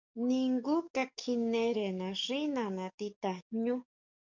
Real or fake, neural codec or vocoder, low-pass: fake; codec, 44.1 kHz, 7.8 kbps, DAC; 7.2 kHz